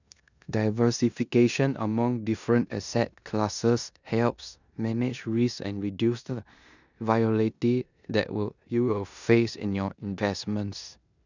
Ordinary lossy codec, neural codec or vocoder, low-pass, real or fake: none; codec, 16 kHz in and 24 kHz out, 0.9 kbps, LongCat-Audio-Codec, four codebook decoder; 7.2 kHz; fake